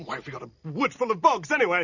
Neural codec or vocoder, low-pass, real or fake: none; 7.2 kHz; real